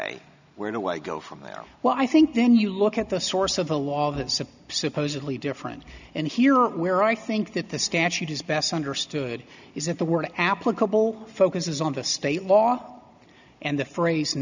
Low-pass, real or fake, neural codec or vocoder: 7.2 kHz; real; none